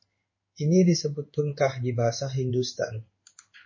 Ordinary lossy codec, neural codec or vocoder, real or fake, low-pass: MP3, 32 kbps; codec, 16 kHz in and 24 kHz out, 1 kbps, XY-Tokenizer; fake; 7.2 kHz